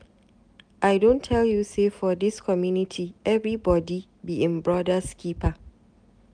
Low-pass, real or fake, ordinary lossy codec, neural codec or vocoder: 9.9 kHz; real; none; none